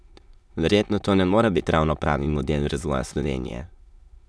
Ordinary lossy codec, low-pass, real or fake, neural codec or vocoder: none; none; fake; autoencoder, 22.05 kHz, a latent of 192 numbers a frame, VITS, trained on many speakers